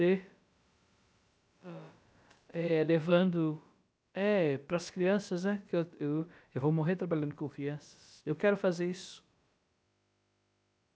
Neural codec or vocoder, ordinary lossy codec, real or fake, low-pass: codec, 16 kHz, about 1 kbps, DyCAST, with the encoder's durations; none; fake; none